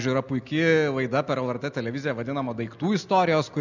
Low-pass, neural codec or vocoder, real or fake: 7.2 kHz; none; real